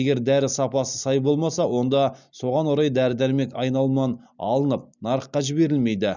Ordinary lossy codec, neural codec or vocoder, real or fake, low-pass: none; none; real; 7.2 kHz